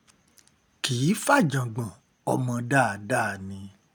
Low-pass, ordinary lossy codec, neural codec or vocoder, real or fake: none; none; none; real